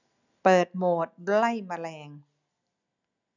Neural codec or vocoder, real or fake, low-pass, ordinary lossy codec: none; real; 7.2 kHz; none